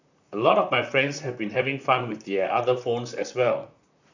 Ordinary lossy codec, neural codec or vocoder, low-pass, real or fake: none; vocoder, 44.1 kHz, 128 mel bands, Pupu-Vocoder; 7.2 kHz; fake